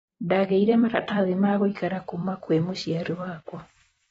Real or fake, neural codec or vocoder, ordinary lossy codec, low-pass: fake; vocoder, 44.1 kHz, 128 mel bands every 256 samples, BigVGAN v2; AAC, 24 kbps; 19.8 kHz